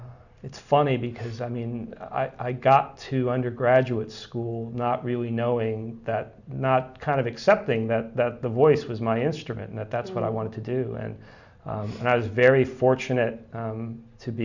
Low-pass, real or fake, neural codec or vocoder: 7.2 kHz; real; none